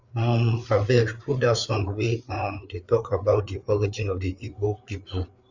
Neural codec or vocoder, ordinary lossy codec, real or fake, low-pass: codec, 16 kHz, 4 kbps, FreqCodec, larger model; none; fake; 7.2 kHz